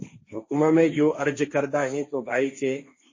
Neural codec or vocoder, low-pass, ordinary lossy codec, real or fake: codec, 16 kHz, 1.1 kbps, Voila-Tokenizer; 7.2 kHz; MP3, 32 kbps; fake